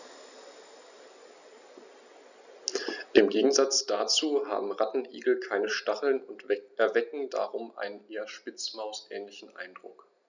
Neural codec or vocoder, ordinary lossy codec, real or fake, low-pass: none; none; real; 7.2 kHz